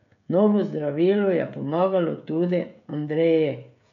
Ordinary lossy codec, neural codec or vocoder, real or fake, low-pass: none; codec, 16 kHz, 16 kbps, FreqCodec, smaller model; fake; 7.2 kHz